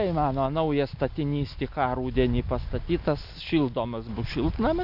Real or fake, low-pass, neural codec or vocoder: real; 5.4 kHz; none